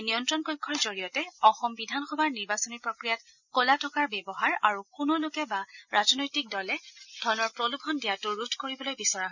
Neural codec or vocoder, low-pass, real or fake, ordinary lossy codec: none; 7.2 kHz; real; none